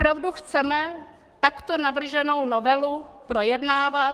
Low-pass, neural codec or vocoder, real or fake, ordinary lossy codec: 14.4 kHz; codec, 32 kHz, 1.9 kbps, SNAC; fake; Opus, 32 kbps